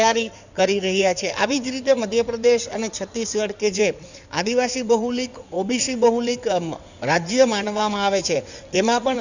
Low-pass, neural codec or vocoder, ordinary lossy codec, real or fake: 7.2 kHz; codec, 16 kHz in and 24 kHz out, 2.2 kbps, FireRedTTS-2 codec; none; fake